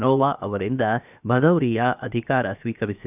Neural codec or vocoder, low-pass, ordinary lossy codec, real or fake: codec, 16 kHz, 0.7 kbps, FocalCodec; 3.6 kHz; none; fake